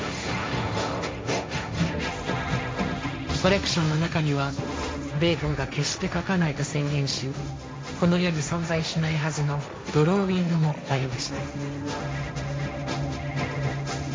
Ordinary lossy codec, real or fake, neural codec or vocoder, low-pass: none; fake; codec, 16 kHz, 1.1 kbps, Voila-Tokenizer; none